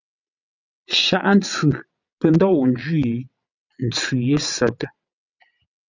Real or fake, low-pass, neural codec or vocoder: fake; 7.2 kHz; vocoder, 22.05 kHz, 80 mel bands, WaveNeXt